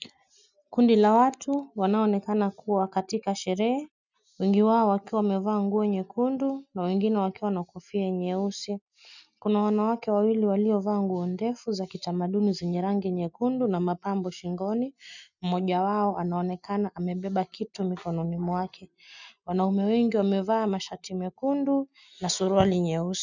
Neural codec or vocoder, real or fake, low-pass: none; real; 7.2 kHz